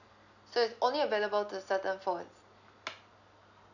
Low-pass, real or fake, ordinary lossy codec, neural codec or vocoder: 7.2 kHz; real; none; none